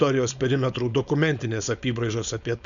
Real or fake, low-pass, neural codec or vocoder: fake; 7.2 kHz; codec, 16 kHz, 4.8 kbps, FACodec